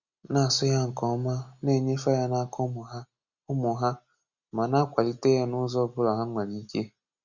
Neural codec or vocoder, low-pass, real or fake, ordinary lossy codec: none; 7.2 kHz; real; Opus, 64 kbps